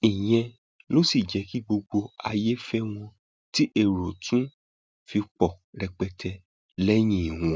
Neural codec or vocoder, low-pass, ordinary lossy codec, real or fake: none; none; none; real